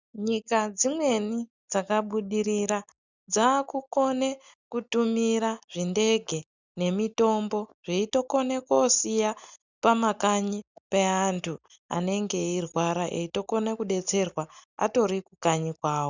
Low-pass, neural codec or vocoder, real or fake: 7.2 kHz; none; real